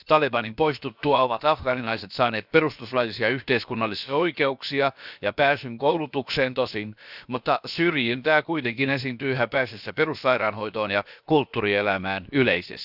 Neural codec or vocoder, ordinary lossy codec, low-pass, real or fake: codec, 16 kHz, about 1 kbps, DyCAST, with the encoder's durations; none; 5.4 kHz; fake